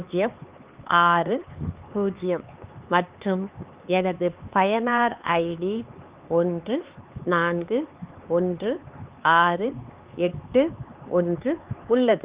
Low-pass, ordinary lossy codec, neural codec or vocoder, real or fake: 3.6 kHz; Opus, 32 kbps; codec, 16 kHz, 4 kbps, X-Codec, HuBERT features, trained on LibriSpeech; fake